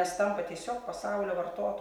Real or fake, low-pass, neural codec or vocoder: real; 19.8 kHz; none